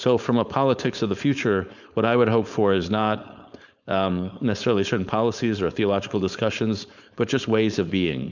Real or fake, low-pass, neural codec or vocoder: fake; 7.2 kHz; codec, 16 kHz, 4.8 kbps, FACodec